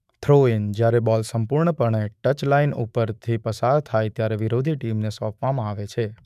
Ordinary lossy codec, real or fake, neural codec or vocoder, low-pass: none; fake; autoencoder, 48 kHz, 128 numbers a frame, DAC-VAE, trained on Japanese speech; 14.4 kHz